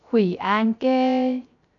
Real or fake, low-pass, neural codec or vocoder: fake; 7.2 kHz; codec, 16 kHz, 0.3 kbps, FocalCodec